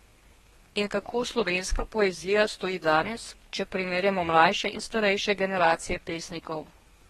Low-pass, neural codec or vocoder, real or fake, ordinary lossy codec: 14.4 kHz; codec, 32 kHz, 1.9 kbps, SNAC; fake; AAC, 32 kbps